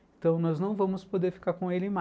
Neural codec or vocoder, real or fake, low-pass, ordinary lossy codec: none; real; none; none